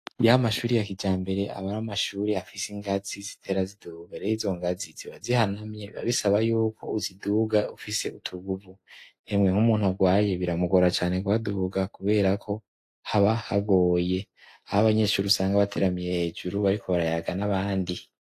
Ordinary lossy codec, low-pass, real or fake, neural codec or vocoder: AAC, 64 kbps; 14.4 kHz; real; none